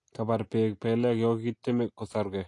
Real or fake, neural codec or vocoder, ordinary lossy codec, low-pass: real; none; AAC, 48 kbps; 10.8 kHz